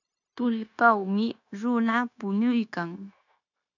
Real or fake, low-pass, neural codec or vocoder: fake; 7.2 kHz; codec, 16 kHz, 0.9 kbps, LongCat-Audio-Codec